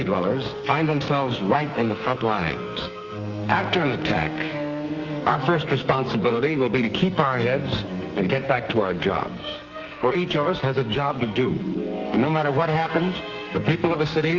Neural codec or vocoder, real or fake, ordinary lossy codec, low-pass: codec, 44.1 kHz, 2.6 kbps, SNAC; fake; Opus, 32 kbps; 7.2 kHz